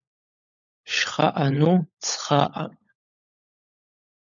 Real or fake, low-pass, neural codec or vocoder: fake; 7.2 kHz; codec, 16 kHz, 16 kbps, FunCodec, trained on LibriTTS, 50 frames a second